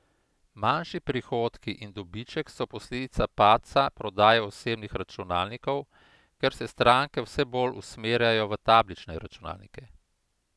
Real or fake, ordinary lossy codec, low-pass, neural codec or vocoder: real; none; none; none